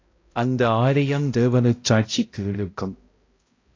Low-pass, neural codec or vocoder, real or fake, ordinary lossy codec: 7.2 kHz; codec, 16 kHz, 0.5 kbps, X-Codec, HuBERT features, trained on balanced general audio; fake; AAC, 32 kbps